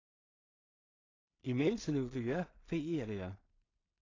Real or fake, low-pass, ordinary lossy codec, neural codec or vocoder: fake; 7.2 kHz; AAC, 32 kbps; codec, 16 kHz in and 24 kHz out, 0.4 kbps, LongCat-Audio-Codec, two codebook decoder